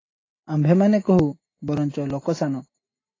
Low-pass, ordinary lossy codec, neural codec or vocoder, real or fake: 7.2 kHz; AAC, 32 kbps; none; real